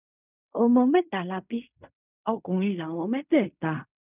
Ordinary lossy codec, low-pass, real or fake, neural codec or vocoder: none; 3.6 kHz; fake; codec, 16 kHz in and 24 kHz out, 0.4 kbps, LongCat-Audio-Codec, fine tuned four codebook decoder